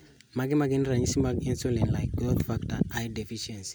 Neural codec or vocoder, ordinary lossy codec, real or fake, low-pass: none; none; real; none